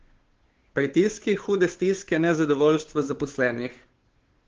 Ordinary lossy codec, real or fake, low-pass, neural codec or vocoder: Opus, 16 kbps; fake; 7.2 kHz; codec, 16 kHz, 2 kbps, FunCodec, trained on Chinese and English, 25 frames a second